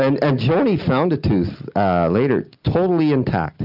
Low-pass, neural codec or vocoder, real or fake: 5.4 kHz; none; real